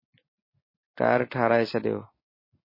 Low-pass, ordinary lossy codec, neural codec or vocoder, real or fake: 5.4 kHz; MP3, 24 kbps; none; real